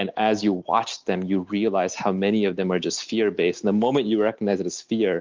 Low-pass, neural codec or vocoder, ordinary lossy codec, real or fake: 7.2 kHz; none; Opus, 32 kbps; real